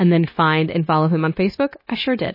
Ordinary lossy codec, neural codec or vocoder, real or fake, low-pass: MP3, 24 kbps; codec, 16 kHz, 0.9 kbps, LongCat-Audio-Codec; fake; 5.4 kHz